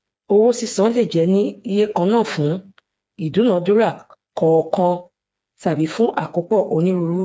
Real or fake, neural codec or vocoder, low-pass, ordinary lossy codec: fake; codec, 16 kHz, 4 kbps, FreqCodec, smaller model; none; none